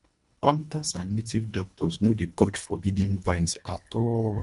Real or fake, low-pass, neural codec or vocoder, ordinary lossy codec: fake; 10.8 kHz; codec, 24 kHz, 1.5 kbps, HILCodec; none